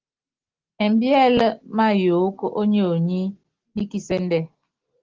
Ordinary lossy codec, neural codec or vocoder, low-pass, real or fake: Opus, 16 kbps; none; 7.2 kHz; real